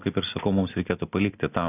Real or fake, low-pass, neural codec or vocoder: real; 3.6 kHz; none